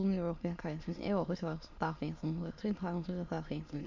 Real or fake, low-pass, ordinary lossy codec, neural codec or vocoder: fake; 7.2 kHz; MP3, 48 kbps; autoencoder, 22.05 kHz, a latent of 192 numbers a frame, VITS, trained on many speakers